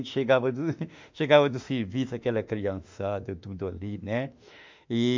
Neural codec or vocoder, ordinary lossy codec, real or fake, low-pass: autoencoder, 48 kHz, 32 numbers a frame, DAC-VAE, trained on Japanese speech; MP3, 64 kbps; fake; 7.2 kHz